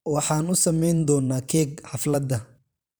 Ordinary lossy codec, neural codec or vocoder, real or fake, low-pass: none; vocoder, 44.1 kHz, 128 mel bands every 512 samples, BigVGAN v2; fake; none